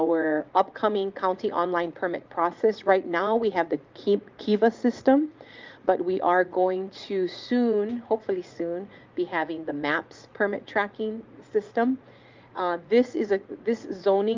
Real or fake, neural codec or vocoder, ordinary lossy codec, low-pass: fake; vocoder, 44.1 kHz, 80 mel bands, Vocos; Opus, 24 kbps; 7.2 kHz